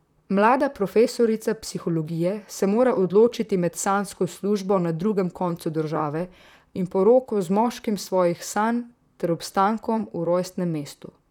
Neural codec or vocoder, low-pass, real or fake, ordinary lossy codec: vocoder, 44.1 kHz, 128 mel bands, Pupu-Vocoder; 19.8 kHz; fake; none